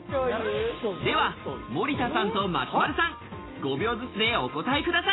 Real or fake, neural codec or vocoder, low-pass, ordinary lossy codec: real; none; 7.2 kHz; AAC, 16 kbps